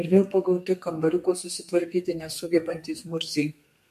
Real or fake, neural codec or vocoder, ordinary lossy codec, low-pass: fake; codec, 32 kHz, 1.9 kbps, SNAC; MP3, 64 kbps; 14.4 kHz